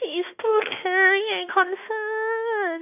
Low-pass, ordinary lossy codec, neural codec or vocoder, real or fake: 3.6 kHz; none; autoencoder, 48 kHz, 32 numbers a frame, DAC-VAE, trained on Japanese speech; fake